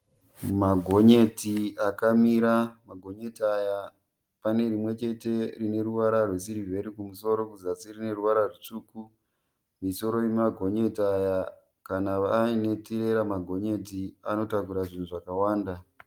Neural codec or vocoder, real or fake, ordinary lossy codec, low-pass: none; real; Opus, 24 kbps; 19.8 kHz